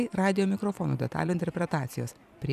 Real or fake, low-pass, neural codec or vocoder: fake; 14.4 kHz; vocoder, 44.1 kHz, 128 mel bands every 256 samples, BigVGAN v2